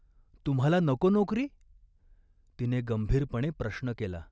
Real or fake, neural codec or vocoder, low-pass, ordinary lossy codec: real; none; none; none